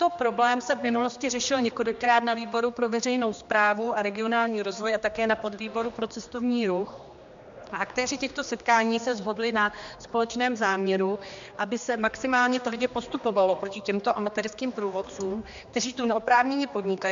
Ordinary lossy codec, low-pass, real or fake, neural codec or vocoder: MP3, 64 kbps; 7.2 kHz; fake; codec, 16 kHz, 2 kbps, X-Codec, HuBERT features, trained on general audio